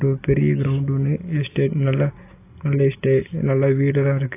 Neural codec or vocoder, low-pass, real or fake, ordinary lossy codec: none; 3.6 kHz; real; AAC, 24 kbps